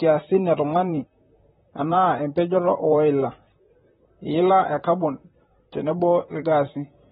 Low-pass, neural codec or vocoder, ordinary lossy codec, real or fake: 19.8 kHz; vocoder, 44.1 kHz, 128 mel bands, Pupu-Vocoder; AAC, 16 kbps; fake